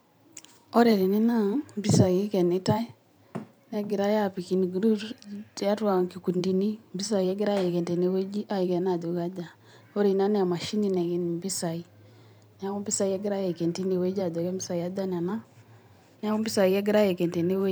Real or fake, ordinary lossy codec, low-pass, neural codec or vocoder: fake; none; none; vocoder, 44.1 kHz, 128 mel bands every 512 samples, BigVGAN v2